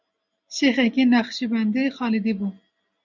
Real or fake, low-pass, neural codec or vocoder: real; 7.2 kHz; none